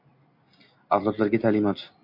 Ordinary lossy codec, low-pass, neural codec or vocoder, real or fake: MP3, 32 kbps; 5.4 kHz; none; real